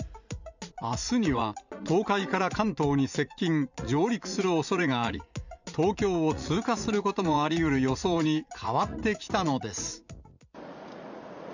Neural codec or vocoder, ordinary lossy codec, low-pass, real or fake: vocoder, 44.1 kHz, 80 mel bands, Vocos; none; 7.2 kHz; fake